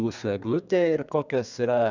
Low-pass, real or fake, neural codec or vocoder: 7.2 kHz; fake; codec, 32 kHz, 1.9 kbps, SNAC